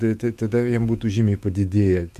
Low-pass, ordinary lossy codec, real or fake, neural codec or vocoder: 14.4 kHz; MP3, 64 kbps; fake; autoencoder, 48 kHz, 128 numbers a frame, DAC-VAE, trained on Japanese speech